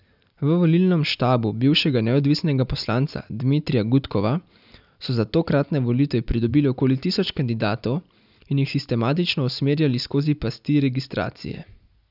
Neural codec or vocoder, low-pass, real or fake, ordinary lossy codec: none; 5.4 kHz; real; none